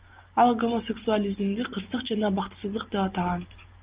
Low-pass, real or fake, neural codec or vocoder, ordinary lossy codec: 3.6 kHz; real; none; Opus, 32 kbps